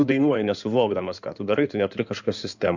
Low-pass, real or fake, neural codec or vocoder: 7.2 kHz; fake; codec, 16 kHz in and 24 kHz out, 2.2 kbps, FireRedTTS-2 codec